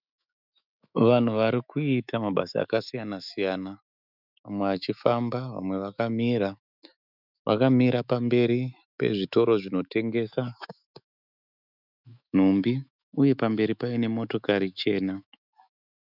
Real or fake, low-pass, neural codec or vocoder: fake; 5.4 kHz; autoencoder, 48 kHz, 128 numbers a frame, DAC-VAE, trained on Japanese speech